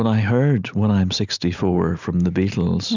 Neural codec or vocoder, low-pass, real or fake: none; 7.2 kHz; real